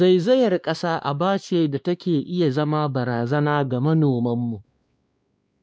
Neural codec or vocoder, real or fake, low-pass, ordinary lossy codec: codec, 16 kHz, 2 kbps, X-Codec, WavLM features, trained on Multilingual LibriSpeech; fake; none; none